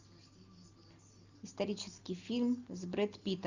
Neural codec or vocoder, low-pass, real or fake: none; 7.2 kHz; real